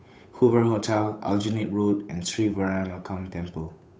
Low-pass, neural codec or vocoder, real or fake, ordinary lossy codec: none; codec, 16 kHz, 8 kbps, FunCodec, trained on Chinese and English, 25 frames a second; fake; none